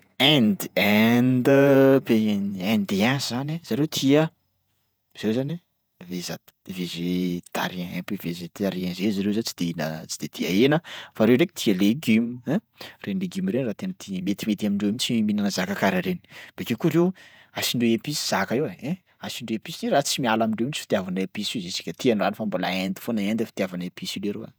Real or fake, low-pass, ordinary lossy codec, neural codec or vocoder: fake; none; none; vocoder, 48 kHz, 128 mel bands, Vocos